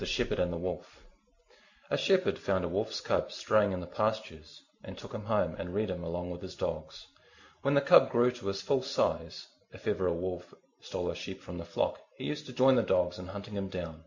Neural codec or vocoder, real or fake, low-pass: none; real; 7.2 kHz